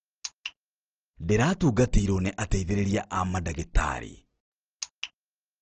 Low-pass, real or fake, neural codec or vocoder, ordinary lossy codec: 7.2 kHz; real; none; Opus, 16 kbps